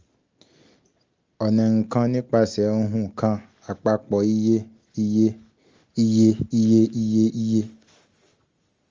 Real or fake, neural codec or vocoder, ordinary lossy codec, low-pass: real; none; Opus, 32 kbps; 7.2 kHz